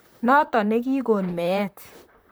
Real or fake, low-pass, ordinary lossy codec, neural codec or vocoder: fake; none; none; vocoder, 44.1 kHz, 128 mel bands, Pupu-Vocoder